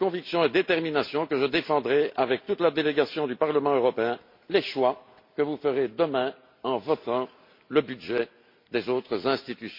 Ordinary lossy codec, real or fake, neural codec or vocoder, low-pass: MP3, 32 kbps; real; none; 5.4 kHz